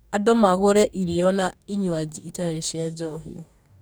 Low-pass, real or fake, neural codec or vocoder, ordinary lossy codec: none; fake; codec, 44.1 kHz, 2.6 kbps, DAC; none